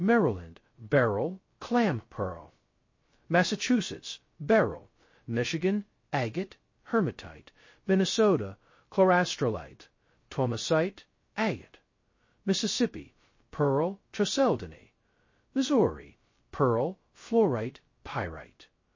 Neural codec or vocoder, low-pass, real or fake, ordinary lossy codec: codec, 16 kHz, 0.2 kbps, FocalCodec; 7.2 kHz; fake; MP3, 32 kbps